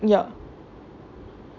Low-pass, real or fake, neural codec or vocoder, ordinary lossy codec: 7.2 kHz; real; none; none